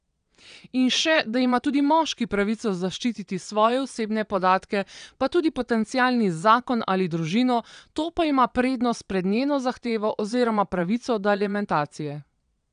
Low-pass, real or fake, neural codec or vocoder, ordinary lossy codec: 9.9 kHz; fake; vocoder, 22.05 kHz, 80 mel bands, Vocos; none